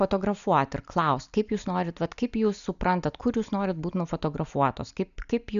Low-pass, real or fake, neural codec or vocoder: 7.2 kHz; real; none